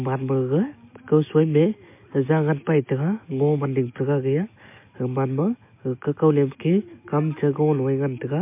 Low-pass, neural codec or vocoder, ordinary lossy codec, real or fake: 3.6 kHz; none; MP3, 24 kbps; real